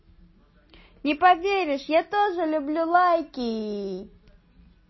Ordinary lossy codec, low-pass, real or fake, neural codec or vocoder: MP3, 24 kbps; 7.2 kHz; real; none